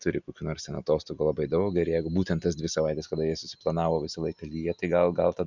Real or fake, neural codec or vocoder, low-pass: real; none; 7.2 kHz